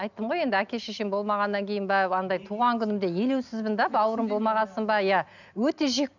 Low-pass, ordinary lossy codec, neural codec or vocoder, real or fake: 7.2 kHz; none; none; real